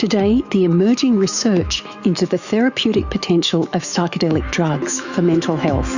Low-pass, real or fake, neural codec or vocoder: 7.2 kHz; fake; autoencoder, 48 kHz, 128 numbers a frame, DAC-VAE, trained on Japanese speech